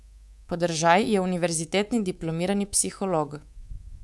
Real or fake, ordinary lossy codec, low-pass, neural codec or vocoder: fake; none; none; codec, 24 kHz, 3.1 kbps, DualCodec